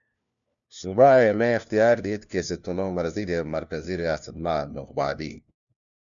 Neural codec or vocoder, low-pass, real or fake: codec, 16 kHz, 1 kbps, FunCodec, trained on LibriTTS, 50 frames a second; 7.2 kHz; fake